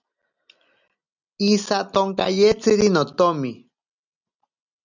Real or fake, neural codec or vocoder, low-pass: real; none; 7.2 kHz